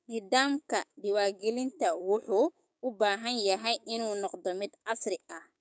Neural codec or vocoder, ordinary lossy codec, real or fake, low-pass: codec, 16 kHz, 6 kbps, DAC; none; fake; none